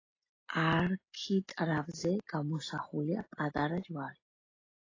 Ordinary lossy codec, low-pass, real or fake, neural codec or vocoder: AAC, 32 kbps; 7.2 kHz; real; none